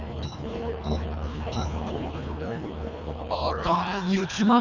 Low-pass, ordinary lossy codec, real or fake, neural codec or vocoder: 7.2 kHz; none; fake; codec, 24 kHz, 1.5 kbps, HILCodec